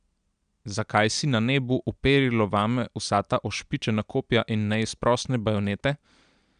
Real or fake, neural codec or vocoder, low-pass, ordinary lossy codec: real; none; 9.9 kHz; none